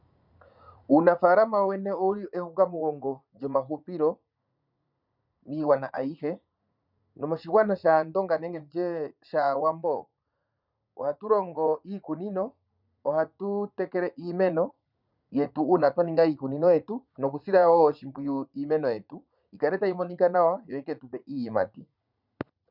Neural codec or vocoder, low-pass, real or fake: vocoder, 44.1 kHz, 80 mel bands, Vocos; 5.4 kHz; fake